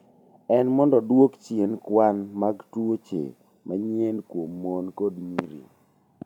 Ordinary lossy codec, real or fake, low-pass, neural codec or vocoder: none; real; 19.8 kHz; none